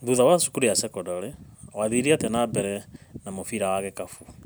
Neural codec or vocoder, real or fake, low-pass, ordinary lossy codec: none; real; none; none